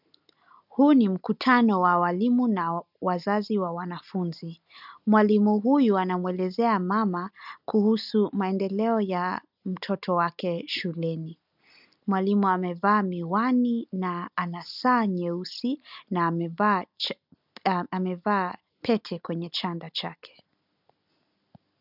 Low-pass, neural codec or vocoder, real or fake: 5.4 kHz; none; real